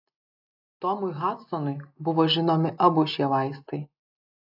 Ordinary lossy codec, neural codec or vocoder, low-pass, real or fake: AAC, 48 kbps; none; 5.4 kHz; real